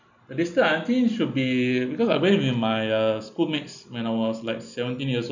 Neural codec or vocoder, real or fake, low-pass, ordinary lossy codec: none; real; 7.2 kHz; none